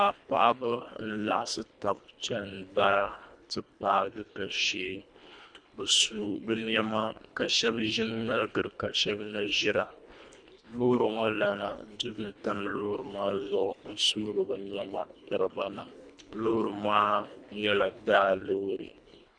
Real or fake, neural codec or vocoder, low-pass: fake; codec, 24 kHz, 1.5 kbps, HILCodec; 9.9 kHz